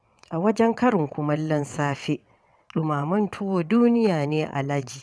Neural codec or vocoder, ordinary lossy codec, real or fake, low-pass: vocoder, 22.05 kHz, 80 mel bands, WaveNeXt; none; fake; none